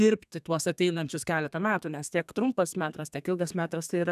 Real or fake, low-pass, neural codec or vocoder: fake; 14.4 kHz; codec, 32 kHz, 1.9 kbps, SNAC